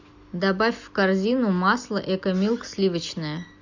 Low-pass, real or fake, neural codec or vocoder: 7.2 kHz; real; none